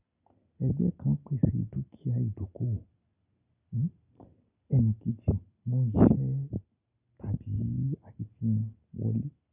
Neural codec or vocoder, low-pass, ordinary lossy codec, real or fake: none; 3.6 kHz; none; real